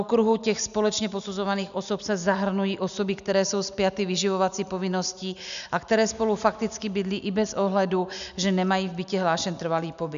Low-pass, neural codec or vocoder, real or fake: 7.2 kHz; none; real